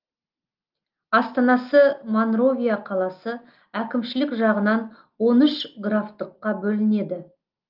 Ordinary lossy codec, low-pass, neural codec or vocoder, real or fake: Opus, 32 kbps; 5.4 kHz; none; real